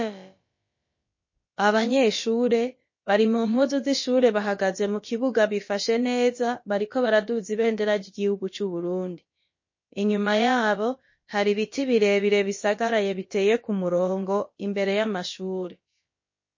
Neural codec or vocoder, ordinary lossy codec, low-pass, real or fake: codec, 16 kHz, about 1 kbps, DyCAST, with the encoder's durations; MP3, 32 kbps; 7.2 kHz; fake